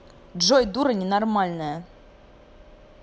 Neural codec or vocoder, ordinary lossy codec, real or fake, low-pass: none; none; real; none